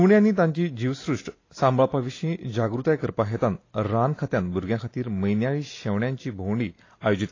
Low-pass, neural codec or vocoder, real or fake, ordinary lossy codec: 7.2 kHz; none; real; AAC, 32 kbps